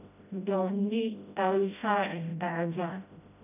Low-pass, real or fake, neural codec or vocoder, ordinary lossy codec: 3.6 kHz; fake; codec, 16 kHz, 0.5 kbps, FreqCodec, smaller model; none